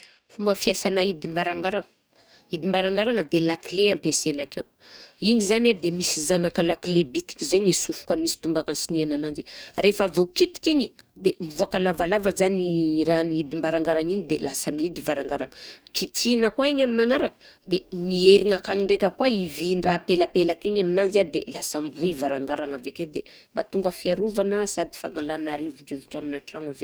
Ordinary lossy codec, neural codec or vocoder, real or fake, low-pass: none; codec, 44.1 kHz, 2.6 kbps, DAC; fake; none